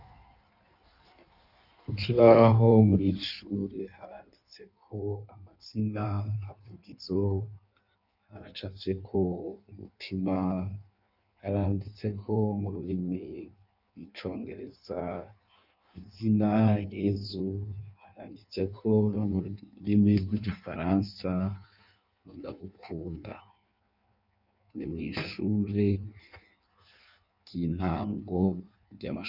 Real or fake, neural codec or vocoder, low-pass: fake; codec, 16 kHz in and 24 kHz out, 1.1 kbps, FireRedTTS-2 codec; 5.4 kHz